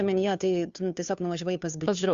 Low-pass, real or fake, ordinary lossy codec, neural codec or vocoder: 7.2 kHz; fake; Opus, 64 kbps; codec, 16 kHz, 2 kbps, FunCodec, trained on Chinese and English, 25 frames a second